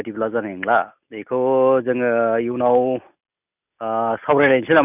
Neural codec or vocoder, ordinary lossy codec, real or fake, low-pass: none; none; real; 3.6 kHz